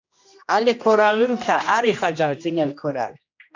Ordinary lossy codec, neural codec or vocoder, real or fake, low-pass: AAC, 48 kbps; codec, 16 kHz, 1 kbps, X-Codec, HuBERT features, trained on general audio; fake; 7.2 kHz